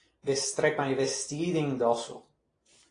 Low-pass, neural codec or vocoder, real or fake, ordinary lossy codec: 9.9 kHz; none; real; AAC, 32 kbps